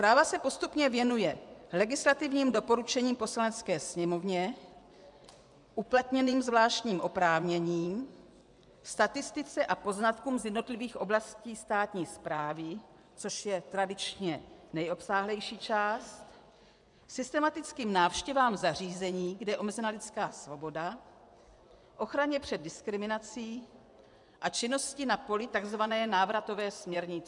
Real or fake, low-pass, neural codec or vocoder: fake; 10.8 kHz; vocoder, 24 kHz, 100 mel bands, Vocos